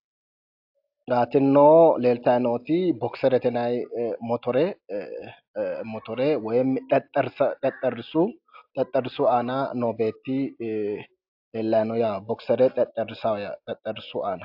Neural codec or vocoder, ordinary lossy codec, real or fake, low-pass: none; AAC, 48 kbps; real; 5.4 kHz